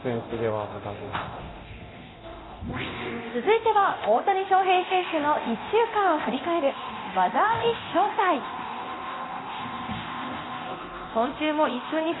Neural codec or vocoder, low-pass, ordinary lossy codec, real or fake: codec, 24 kHz, 0.9 kbps, DualCodec; 7.2 kHz; AAC, 16 kbps; fake